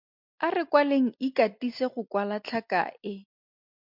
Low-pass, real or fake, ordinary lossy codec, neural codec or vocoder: 5.4 kHz; real; MP3, 48 kbps; none